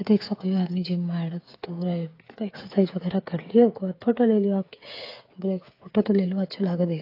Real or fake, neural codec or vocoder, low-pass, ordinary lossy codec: fake; codec, 16 kHz, 8 kbps, FreqCodec, smaller model; 5.4 kHz; AAC, 32 kbps